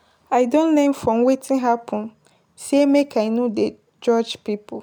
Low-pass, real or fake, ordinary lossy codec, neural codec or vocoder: none; real; none; none